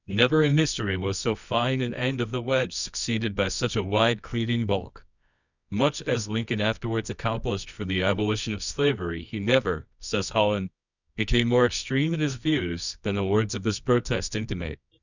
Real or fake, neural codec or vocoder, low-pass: fake; codec, 24 kHz, 0.9 kbps, WavTokenizer, medium music audio release; 7.2 kHz